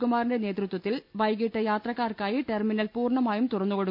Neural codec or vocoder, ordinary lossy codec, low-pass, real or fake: none; none; 5.4 kHz; real